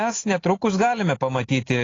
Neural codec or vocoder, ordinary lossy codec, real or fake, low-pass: none; AAC, 32 kbps; real; 7.2 kHz